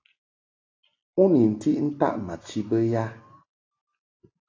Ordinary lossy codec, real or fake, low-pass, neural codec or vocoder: AAC, 32 kbps; real; 7.2 kHz; none